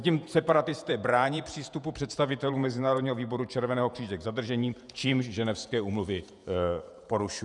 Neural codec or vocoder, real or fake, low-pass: vocoder, 48 kHz, 128 mel bands, Vocos; fake; 10.8 kHz